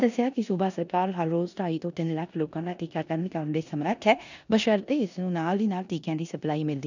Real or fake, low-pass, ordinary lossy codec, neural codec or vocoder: fake; 7.2 kHz; none; codec, 16 kHz in and 24 kHz out, 0.9 kbps, LongCat-Audio-Codec, four codebook decoder